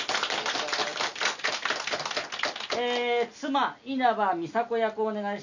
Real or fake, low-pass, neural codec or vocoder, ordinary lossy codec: real; 7.2 kHz; none; none